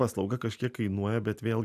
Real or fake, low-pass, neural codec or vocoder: real; 14.4 kHz; none